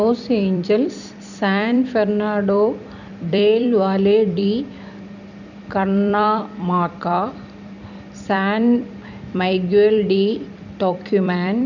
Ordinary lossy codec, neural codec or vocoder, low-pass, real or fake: none; vocoder, 44.1 kHz, 128 mel bands every 256 samples, BigVGAN v2; 7.2 kHz; fake